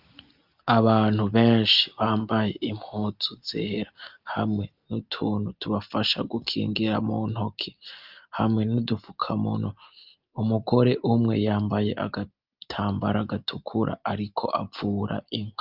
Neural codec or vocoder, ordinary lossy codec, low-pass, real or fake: none; Opus, 24 kbps; 5.4 kHz; real